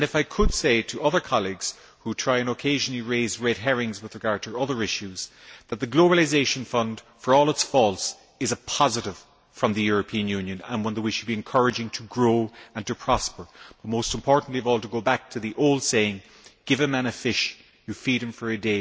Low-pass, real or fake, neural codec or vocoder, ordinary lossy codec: none; real; none; none